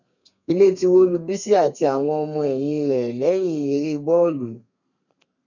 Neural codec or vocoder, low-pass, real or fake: codec, 32 kHz, 1.9 kbps, SNAC; 7.2 kHz; fake